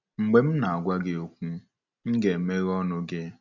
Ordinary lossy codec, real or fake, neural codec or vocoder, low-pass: none; real; none; 7.2 kHz